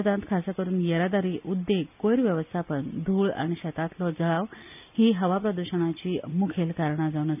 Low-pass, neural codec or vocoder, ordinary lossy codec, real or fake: 3.6 kHz; none; none; real